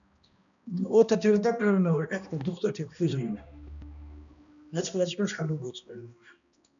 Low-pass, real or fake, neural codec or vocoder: 7.2 kHz; fake; codec, 16 kHz, 1 kbps, X-Codec, HuBERT features, trained on balanced general audio